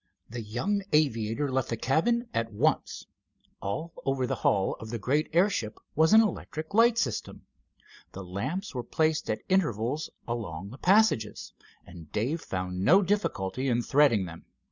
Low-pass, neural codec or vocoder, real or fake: 7.2 kHz; none; real